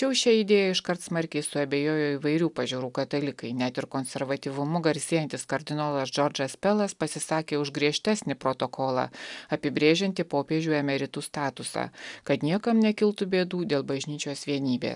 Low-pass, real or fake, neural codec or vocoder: 10.8 kHz; real; none